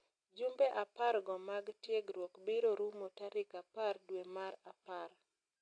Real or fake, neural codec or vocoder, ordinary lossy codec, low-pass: real; none; AAC, 48 kbps; 9.9 kHz